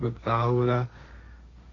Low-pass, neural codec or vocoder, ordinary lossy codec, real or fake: 7.2 kHz; codec, 16 kHz, 1.1 kbps, Voila-Tokenizer; AAC, 32 kbps; fake